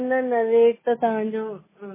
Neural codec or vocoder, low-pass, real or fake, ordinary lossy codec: none; 3.6 kHz; real; MP3, 16 kbps